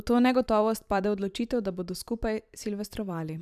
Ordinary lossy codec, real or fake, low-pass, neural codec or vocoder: none; fake; 14.4 kHz; vocoder, 44.1 kHz, 128 mel bands every 512 samples, BigVGAN v2